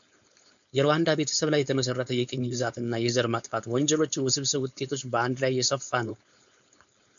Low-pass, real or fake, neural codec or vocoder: 7.2 kHz; fake; codec, 16 kHz, 4.8 kbps, FACodec